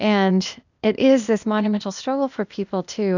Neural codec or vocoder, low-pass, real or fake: codec, 16 kHz, 0.8 kbps, ZipCodec; 7.2 kHz; fake